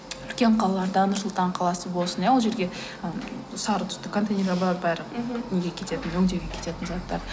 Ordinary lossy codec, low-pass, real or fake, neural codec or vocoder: none; none; real; none